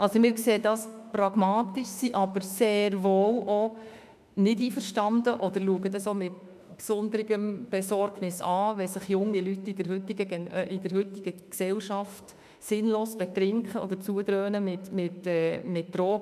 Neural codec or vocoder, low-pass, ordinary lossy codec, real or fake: autoencoder, 48 kHz, 32 numbers a frame, DAC-VAE, trained on Japanese speech; 14.4 kHz; none; fake